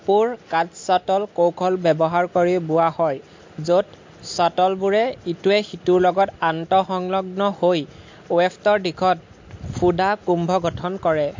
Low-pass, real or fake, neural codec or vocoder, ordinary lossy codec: 7.2 kHz; real; none; MP3, 48 kbps